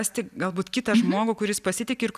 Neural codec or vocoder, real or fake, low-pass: none; real; 14.4 kHz